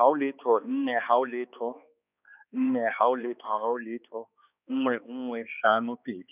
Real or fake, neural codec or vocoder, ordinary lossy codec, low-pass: fake; codec, 16 kHz, 2 kbps, X-Codec, HuBERT features, trained on balanced general audio; none; 3.6 kHz